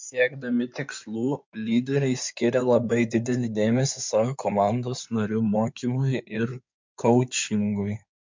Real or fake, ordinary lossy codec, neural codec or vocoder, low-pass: fake; MP3, 64 kbps; codec, 16 kHz in and 24 kHz out, 2.2 kbps, FireRedTTS-2 codec; 7.2 kHz